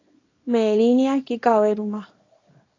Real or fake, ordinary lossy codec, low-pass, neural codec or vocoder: fake; AAC, 32 kbps; 7.2 kHz; codec, 24 kHz, 0.9 kbps, WavTokenizer, small release